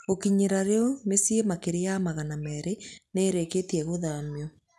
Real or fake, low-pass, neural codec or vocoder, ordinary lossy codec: real; none; none; none